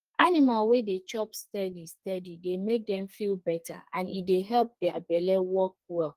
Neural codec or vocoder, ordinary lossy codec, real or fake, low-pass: codec, 32 kHz, 1.9 kbps, SNAC; Opus, 24 kbps; fake; 14.4 kHz